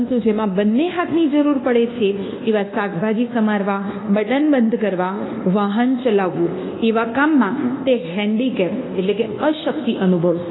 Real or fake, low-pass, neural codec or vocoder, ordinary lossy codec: fake; 7.2 kHz; codec, 24 kHz, 1.2 kbps, DualCodec; AAC, 16 kbps